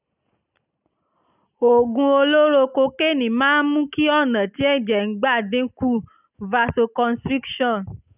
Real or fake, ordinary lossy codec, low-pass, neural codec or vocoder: real; none; 3.6 kHz; none